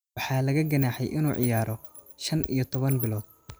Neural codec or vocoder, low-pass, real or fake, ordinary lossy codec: none; none; real; none